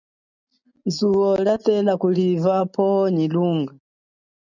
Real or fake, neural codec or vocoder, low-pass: real; none; 7.2 kHz